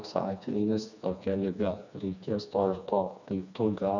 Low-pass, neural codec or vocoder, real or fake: 7.2 kHz; codec, 16 kHz, 2 kbps, FreqCodec, smaller model; fake